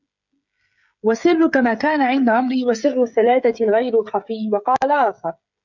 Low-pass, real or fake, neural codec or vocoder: 7.2 kHz; fake; codec, 16 kHz, 16 kbps, FreqCodec, smaller model